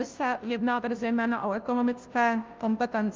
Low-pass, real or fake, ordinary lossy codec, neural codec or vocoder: 7.2 kHz; fake; Opus, 32 kbps; codec, 16 kHz, 0.5 kbps, FunCodec, trained on Chinese and English, 25 frames a second